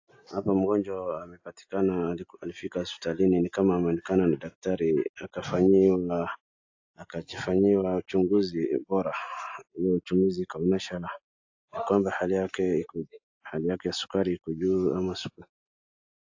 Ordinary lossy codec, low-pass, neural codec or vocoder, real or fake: AAC, 48 kbps; 7.2 kHz; none; real